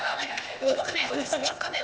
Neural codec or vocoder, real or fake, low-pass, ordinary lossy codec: codec, 16 kHz, 0.8 kbps, ZipCodec; fake; none; none